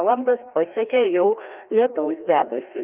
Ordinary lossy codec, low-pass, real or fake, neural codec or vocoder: Opus, 24 kbps; 3.6 kHz; fake; codec, 16 kHz, 1 kbps, FreqCodec, larger model